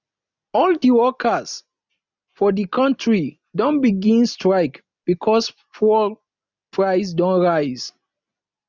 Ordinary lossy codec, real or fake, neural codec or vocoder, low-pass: none; real; none; 7.2 kHz